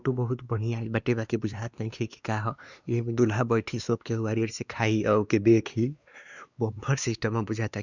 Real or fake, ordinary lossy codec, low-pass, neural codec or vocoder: fake; Opus, 64 kbps; 7.2 kHz; codec, 16 kHz, 2 kbps, X-Codec, WavLM features, trained on Multilingual LibriSpeech